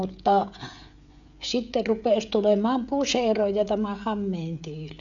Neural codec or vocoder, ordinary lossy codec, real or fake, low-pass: codec, 16 kHz, 16 kbps, FreqCodec, smaller model; none; fake; 7.2 kHz